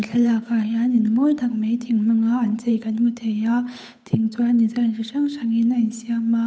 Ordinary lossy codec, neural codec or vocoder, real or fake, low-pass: none; codec, 16 kHz, 8 kbps, FunCodec, trained on Chinese and English, 25 frames a second; fake; none